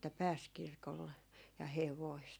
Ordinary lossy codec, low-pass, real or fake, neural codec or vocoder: none; none; real; none